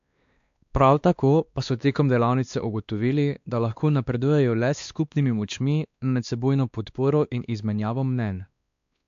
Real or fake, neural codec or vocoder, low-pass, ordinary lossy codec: fake; codec, 16 kHz, 2 kbps, X-Codec, WavLM features, trained on Multilingual LibriSpeech; 7.2 kHz; AAC, 64 kbps